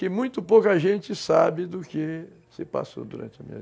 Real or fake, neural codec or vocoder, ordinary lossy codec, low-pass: real; none; none; none